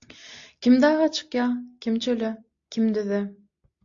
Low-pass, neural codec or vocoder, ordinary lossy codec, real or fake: 7.2 kHz; none; AAC, 48 kbps; real